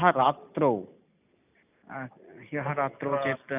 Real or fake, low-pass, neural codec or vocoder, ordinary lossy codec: real; 3.6 kHz; none; none